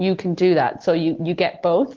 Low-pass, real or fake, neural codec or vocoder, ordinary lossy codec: 7.2 kHz; real; none; Opus, 16 kbps